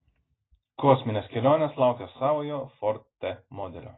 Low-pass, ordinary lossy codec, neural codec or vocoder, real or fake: 7.2 kHz; AAC, 16 kbps; none; real